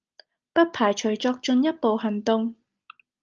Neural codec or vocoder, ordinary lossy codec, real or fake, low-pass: none; Opus, 24 kbps; real; 7.2 kHz